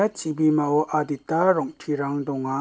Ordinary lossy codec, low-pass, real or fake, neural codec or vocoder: none; none; real; none